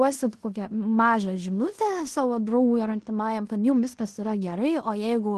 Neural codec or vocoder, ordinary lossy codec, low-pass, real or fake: codec, 16 kHz in and 24 kHz out, 0.9 kbps, LongCat-Audio-Codec, fine tuned four codebook decoder; Opus, 16 kbps; 10.8 kHz; fake